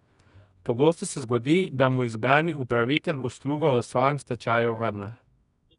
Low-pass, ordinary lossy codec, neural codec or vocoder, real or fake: 10.8 kHz; none; codec, 24 kHz, 0.9 kbps, WavTokenizer, medium music audio release; fake